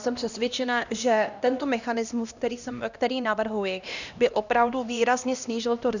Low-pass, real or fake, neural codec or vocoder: 7.2 kHz; fake; codec, 16 kHz, 1 kbps, X-Codec, HuBERT features, trained on LibriSpeech